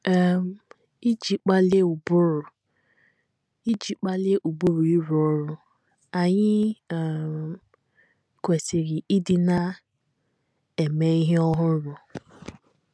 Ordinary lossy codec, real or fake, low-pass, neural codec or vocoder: none; real; none; none